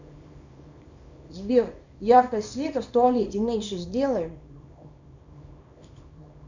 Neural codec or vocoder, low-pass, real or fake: codec, 24 kHz, 0.9 kbps, WavTokenizer, small release; 7.2 kHz; fake